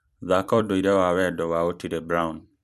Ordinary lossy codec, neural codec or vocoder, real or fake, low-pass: none; vocoder, 44.1 kHz, 128 mel bands every 256 samples, BigVGAN v2; fake; 14.4 kHz